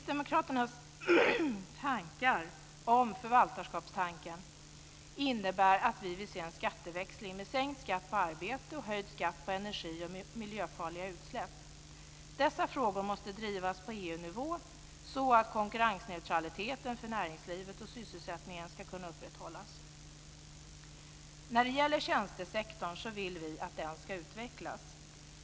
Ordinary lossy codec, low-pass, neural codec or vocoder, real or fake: none; none; none; real